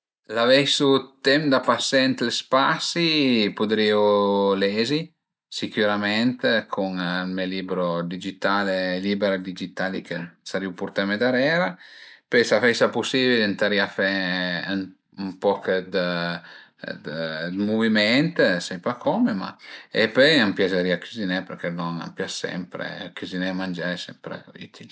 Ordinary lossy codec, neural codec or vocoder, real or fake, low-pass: none; none; real; none